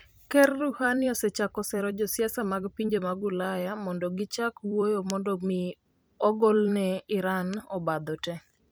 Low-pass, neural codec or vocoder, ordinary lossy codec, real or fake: none; vocoder, 44.1 kHz, 128 mel bands every 256 samples, BigVGAN v2; none; fake